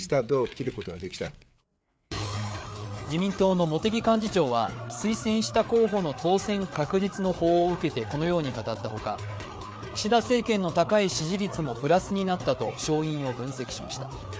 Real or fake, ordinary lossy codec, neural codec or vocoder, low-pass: fake; none; codec, 16 kHz, 4 kbps, FreqCodec, larger model; none